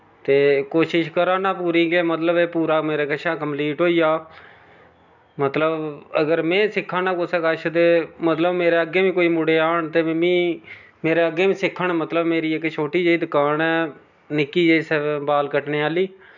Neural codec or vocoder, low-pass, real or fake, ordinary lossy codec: none; 7.2 kHz; real; none